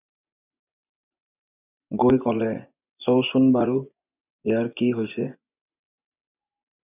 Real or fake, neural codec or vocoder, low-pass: fake; vocoder, 24 kHz, 100 mel bands, Vocos; 3.6 kHz